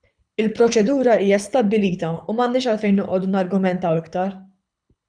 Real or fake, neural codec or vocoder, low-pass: fake; codec, 24 kHz, 6 kbps, HILCodec; 9.9 kHz